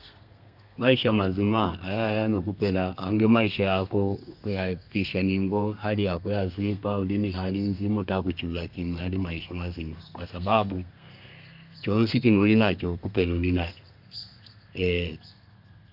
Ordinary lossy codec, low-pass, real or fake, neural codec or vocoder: none; 5.4 kHz; fake; codec, 32 kHz, 1.9 kbps, SNAC